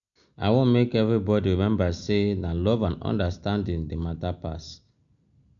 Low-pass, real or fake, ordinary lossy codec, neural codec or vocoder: 7.2 kHz; real; none; none